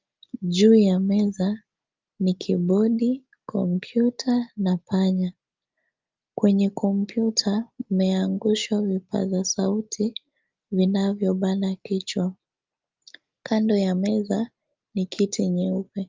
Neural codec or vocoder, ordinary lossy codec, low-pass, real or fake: none; Opus, 24 kbps; 7.2 kHz; real